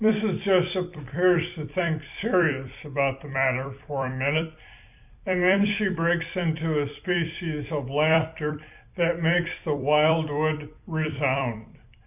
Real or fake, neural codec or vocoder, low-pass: fake; vocoder, 44.1 kHz, 128 mel bands every 256 samples, BigVGAN v2; 3.6 kHz